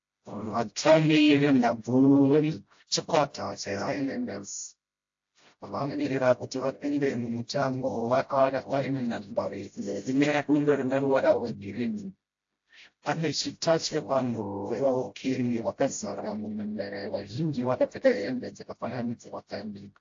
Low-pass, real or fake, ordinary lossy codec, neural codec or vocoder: 7.2 kHz; fake; AAC, 48 kbps; codec, 16 kHz, 0.5 kbps, FreqCodec, smaller model